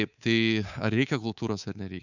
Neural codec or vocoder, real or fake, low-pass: codec, 24 kHz, 3.1 kbps, DualCodec; fake; 7.2 kHz